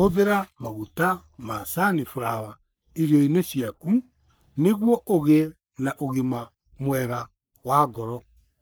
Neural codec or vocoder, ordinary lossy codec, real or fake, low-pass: codec, 44.1 kHz, 3.4 kbps, Pupu-Codec; none; fake; none